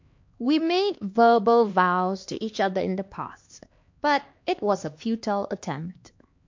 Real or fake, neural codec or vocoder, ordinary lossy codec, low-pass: fake; codec, 16 kHz, 2 kbps, X-Codec, HuBERT features, trained on LibriSpeech; MP3, 48 kbps; 7.2 kHz